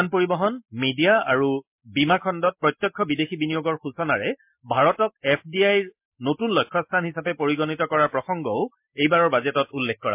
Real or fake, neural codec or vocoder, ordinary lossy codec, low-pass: real; none; MP3, 32 kbps; 3.6 kHz